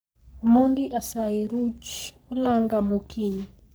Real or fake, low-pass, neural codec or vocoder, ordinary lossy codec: fake; none; codec, 44.1 kHz, 3.4 kbps, Pupu-Codec; none